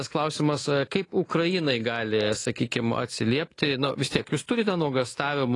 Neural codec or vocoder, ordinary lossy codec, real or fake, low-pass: codec, 24 kHz, 3.1 kbps, DualCodec; AAC, 32 kbps; fake; 10.8 kHz